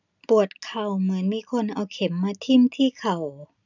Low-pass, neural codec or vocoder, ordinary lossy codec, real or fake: 7.2 kHz; none; none; real